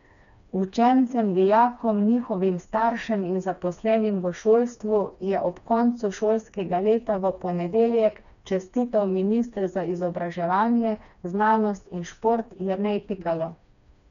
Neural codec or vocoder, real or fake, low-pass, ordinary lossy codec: codec, 16 kHz, 2 kbps, FreqCodec, smaller model; fake; 7.2 kHz; none